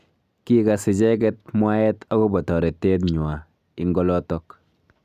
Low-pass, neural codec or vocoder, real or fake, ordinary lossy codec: 14.4 kHz; none; real; none